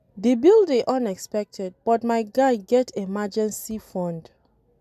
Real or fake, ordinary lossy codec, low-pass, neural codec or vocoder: real; none; 14.4 kHz; none